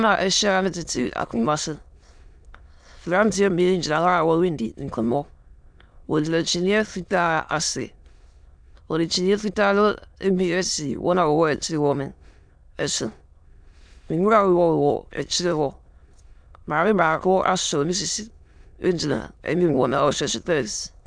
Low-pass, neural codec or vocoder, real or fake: 9.9 kHz; autoencoder, 22.05 kHz, a latent of 192 numbers a frame, VITS, trained on many speakers; fake